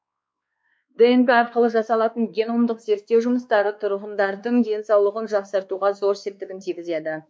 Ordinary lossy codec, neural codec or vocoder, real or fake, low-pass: none; codec, 16 kHz, 2 kbps, X-Codec, WavLM features, trained on Multilingual LibriSpeech; fake; none